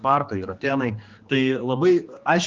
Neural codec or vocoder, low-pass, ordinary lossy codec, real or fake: codec, 16 kHz, 2 kbps, X-Codec, HuBERT features, trained on general audio; 7.2 kHz; Opus, 24 kbps; fake